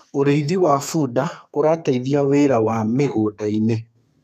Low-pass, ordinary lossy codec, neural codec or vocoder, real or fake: 14.4 kHz; none; codec, 32 kHz, 1.9 kbps, SNAC; fake